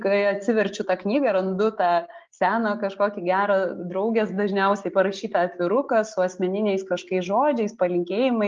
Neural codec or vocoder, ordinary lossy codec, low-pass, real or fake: none; Opus, 32 kbps; 7.2 kHz; real